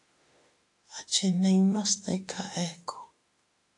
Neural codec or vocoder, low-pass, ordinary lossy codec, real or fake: autoencoder, 48 kHz, 32 numbers a frame, DAC-VAE, trained on Japanese speech; 10.8 kHz; AAC, 48 kbps; fake